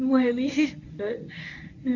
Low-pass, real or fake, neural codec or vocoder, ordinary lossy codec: 7.2 kHz; fake; codec, 24 kHz, 0.9 kbps, WavTokenizer, medium speech release version 1; none